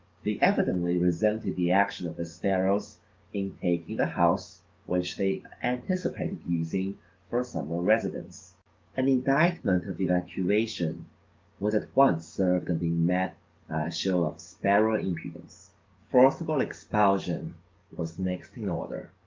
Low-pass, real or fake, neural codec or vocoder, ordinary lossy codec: 7.2 kHz; fake; codec, 44.1 kHz, 7.8 kbps, DAC; Opus, 32 kbps